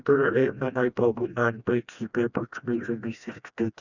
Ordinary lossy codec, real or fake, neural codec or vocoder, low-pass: none; fake; codec, 16 kHz, 1 kbps, FreqCodec, smaller model; 7.2 kHz